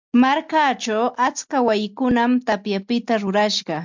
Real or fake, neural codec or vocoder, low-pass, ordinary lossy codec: real; none; 7.2 kHz; MP3, 64 kbps